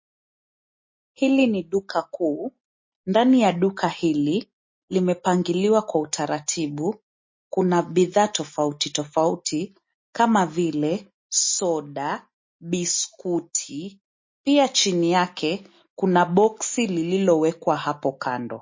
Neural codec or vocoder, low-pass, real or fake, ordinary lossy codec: none; 7.2 kHz; real; MP3, 32 kbps